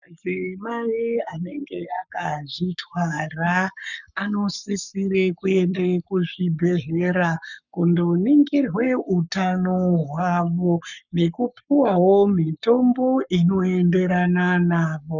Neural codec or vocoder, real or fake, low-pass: codec, 44.1 kHz, 7.8 kbps, Pupu-Codec; fake; 7.2 kHz